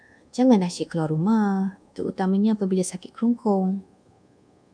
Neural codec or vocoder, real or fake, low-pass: codec, 24 kHz, 1.2 kbps, DualCodec; fake; 9.9 kHz